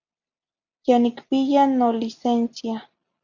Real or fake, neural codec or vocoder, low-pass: real; none; 7.2 kHz